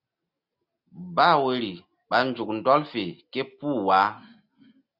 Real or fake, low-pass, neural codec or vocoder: real; 5.4 kHz; none